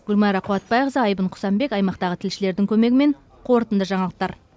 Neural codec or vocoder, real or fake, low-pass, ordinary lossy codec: none; real; none; none